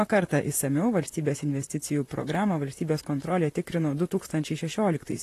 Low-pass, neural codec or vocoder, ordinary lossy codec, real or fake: 14.4 kHz; vocoder, 44.1 kHz, 128 mel bands, Pupu-Vocoder; AAC, 48 kbps; fake